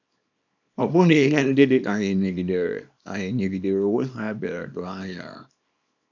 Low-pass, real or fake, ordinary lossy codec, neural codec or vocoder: 7.2 kHz; fake; none; codec, 24 kHz, 0.9 kbps, WavTokenizer, small release